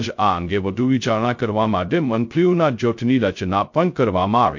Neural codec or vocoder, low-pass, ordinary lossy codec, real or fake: codec, 16 kHz, 0.2 kbps, FocalCodec; 7.2 kHz; MP3, 48 kbps; fake